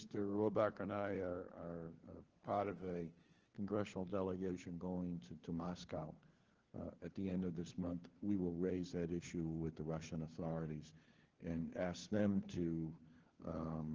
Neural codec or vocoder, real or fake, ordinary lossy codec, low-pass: codec, 16 kHz, 4 kbps, FunCodec, trained on LibriTTS, 50 frames a second; fake; Opus, 16 kbps; 7.2 kHz